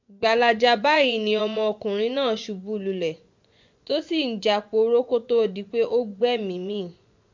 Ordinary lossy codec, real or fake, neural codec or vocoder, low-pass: MP3, 64 kbps; fake; vocoder, 24 kHz, 100 mel bands, Vocos; 7.2 kHz